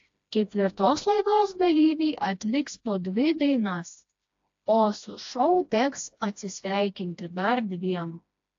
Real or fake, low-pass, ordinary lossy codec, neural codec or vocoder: fake; 7.2 kHz; AAC, 48 kbps; codec, 16 kHz, 1 kbps, FreqCodec, smaller model